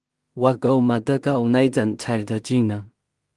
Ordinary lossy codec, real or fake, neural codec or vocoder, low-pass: Opus, 24 kbps; fake; codec, 16 kHz in and 24 kHz out, 0.4 kbps, LongCat-Audio-Codec, two codebook decoder; 10.8 kHz